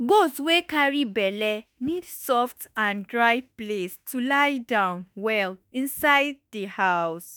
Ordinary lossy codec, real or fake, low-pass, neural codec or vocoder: none; fake; none; autoencoder, 48 kHz, 32 numbers a frame, DAC-VAE, trained on Japanese speech